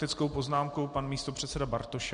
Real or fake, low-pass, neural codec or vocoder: real; 9.9 kHz; none